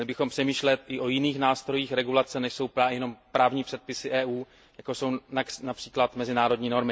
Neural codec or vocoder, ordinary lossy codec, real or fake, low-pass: none; none; real; none